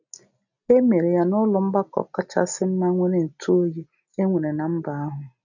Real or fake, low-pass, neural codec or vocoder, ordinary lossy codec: real; 7.2 kHz; none; none